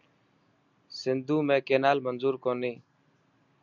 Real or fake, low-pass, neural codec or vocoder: real; 7.2 kHz; none